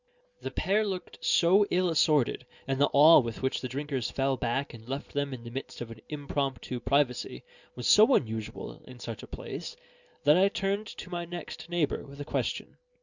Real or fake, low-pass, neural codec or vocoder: real; 7.2 kHz; none